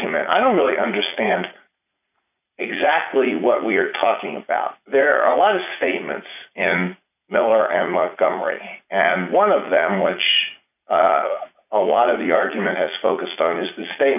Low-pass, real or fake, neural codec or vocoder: 3.6 kHz; fake; vocoder, 44.1 kHz, 80 mel bands, Vocos